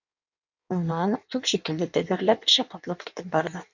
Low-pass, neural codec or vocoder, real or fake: 7.2 kHz; codec, 16 kHz in and 24 kHz out, 1.1 kbps, FireRedTTS-2 codec; fake